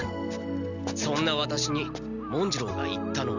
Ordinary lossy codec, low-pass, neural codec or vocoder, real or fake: Opus, 64 kbps; 7.2 kHz; none; real